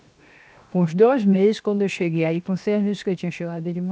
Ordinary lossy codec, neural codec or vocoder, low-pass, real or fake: none; codec, 16 kHz, 0.7 kbps, FocalCodec; none; fake